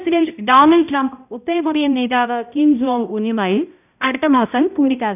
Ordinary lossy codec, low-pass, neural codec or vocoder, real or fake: none; 3.6 kHz; codec, 16 kHz, 0.5 kbps, X-Codec, HuBERT features, trained on balanced general audio; fake